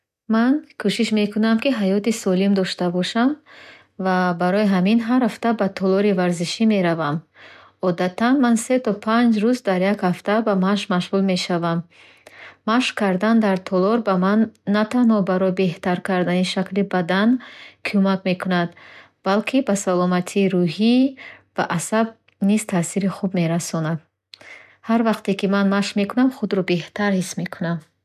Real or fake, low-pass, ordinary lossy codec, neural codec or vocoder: real; 14.4 kHz; none; none